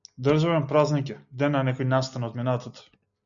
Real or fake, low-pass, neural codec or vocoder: real; 7.2 kHz; none